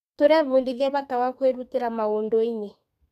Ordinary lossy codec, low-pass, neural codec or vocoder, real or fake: none; 14.4 kHz; codec, 32 kHz, 1.9 kbps, SNAC; fake